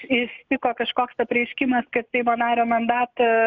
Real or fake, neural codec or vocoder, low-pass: real; none; 7.2 kHz